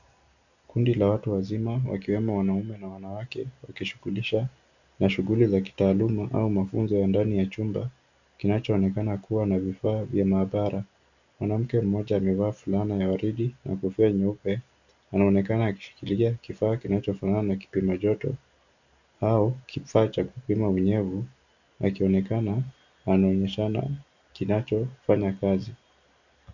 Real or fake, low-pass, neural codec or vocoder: real; 7.2 kHz; none